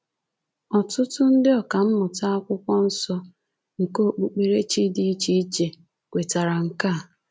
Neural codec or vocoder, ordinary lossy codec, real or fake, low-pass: none; none; real; none